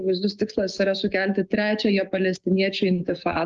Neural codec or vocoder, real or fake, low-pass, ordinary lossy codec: codec, 16 kHz, 6 kbps, DAC; fake; 7.2 kHz; Opus, 32 kbps